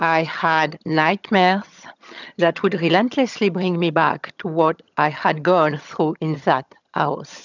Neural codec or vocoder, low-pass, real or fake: vocoder, 22.05 kHz, 80 mel bands, HiFi-GAN; 7.2 kHz; fake